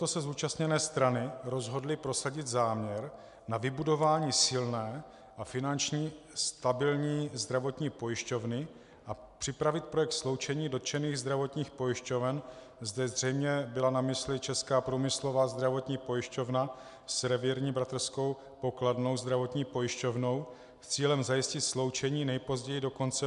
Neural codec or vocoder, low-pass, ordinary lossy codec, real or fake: none; 10.8 kHz; MP3, 96 kbps; real